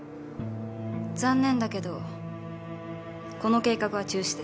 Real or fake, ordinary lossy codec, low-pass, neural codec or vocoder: real; none; none; none